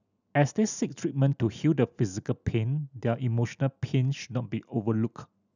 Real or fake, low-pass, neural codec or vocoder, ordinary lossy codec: real; 7.2 kHz; none; none